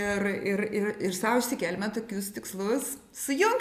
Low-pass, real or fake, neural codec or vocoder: 14.4 kHz; real; none